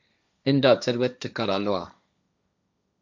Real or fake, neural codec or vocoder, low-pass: fake; codec, 16 kHz, 1.1 kbps, Voila-Tokenizer; 7.2 kHz